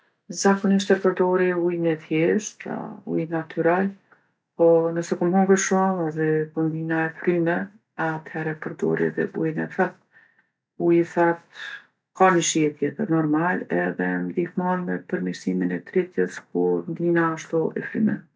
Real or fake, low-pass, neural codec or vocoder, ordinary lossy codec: real; none; none; none